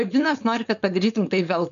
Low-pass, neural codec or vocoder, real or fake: 7.2 kHz; codec, 16 kHz, 4.8 kbps, FACodec; fake